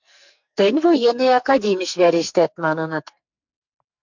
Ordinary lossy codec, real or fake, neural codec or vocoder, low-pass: MP3, 64 kbps; fake; codec, 44.1 kHz, 2.6 kbps, SNAC; 7.2 kHz